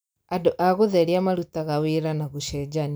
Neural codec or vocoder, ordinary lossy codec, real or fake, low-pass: none; none; real; none